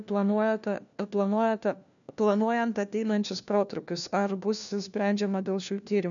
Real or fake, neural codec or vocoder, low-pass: fake; codec, 16 kHz, 1 kbps, FunCodec, trained on LibriTTS, 50 frames a second; 7.2 kHz